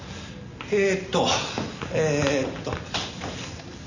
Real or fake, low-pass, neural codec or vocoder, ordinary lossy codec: real; 7.2 kHz; none; none